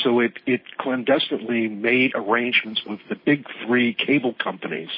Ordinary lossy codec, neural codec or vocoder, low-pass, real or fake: MP3, 24 kbps; none; 5.4 kHz; real